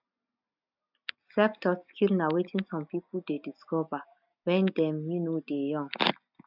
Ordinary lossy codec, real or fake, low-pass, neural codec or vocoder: none; real; 5.4 kHz; none